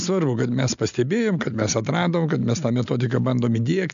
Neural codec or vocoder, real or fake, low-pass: none; real; 7.2 kHz